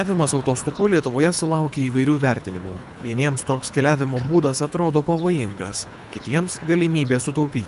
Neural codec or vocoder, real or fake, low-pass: codec, 24 kHz, 3 kbps, HILCodec; fake; 10.8 kHz